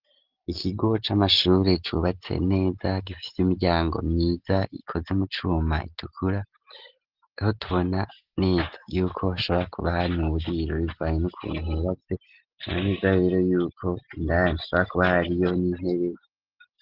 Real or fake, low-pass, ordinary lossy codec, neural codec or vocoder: real; 5.4 kHz; Opus, 16 kbps; none